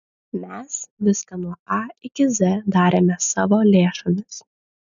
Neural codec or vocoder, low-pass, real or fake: none; 7.2 kHz; real